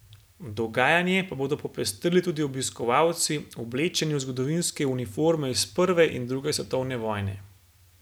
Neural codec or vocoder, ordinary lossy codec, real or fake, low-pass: none; none; real; none